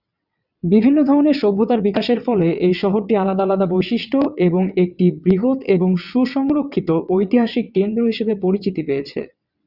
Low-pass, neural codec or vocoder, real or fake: 5.4 kHz; vocoder, 22.05 kHz, 80 mel bands, WaveNeXt; fake